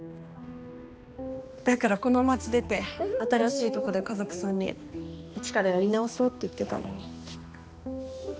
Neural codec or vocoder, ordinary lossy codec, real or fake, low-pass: codec, 16 kHz, 2 kbps, X-Codec, HuBERT features, trained on balanced general audio; none; fake; none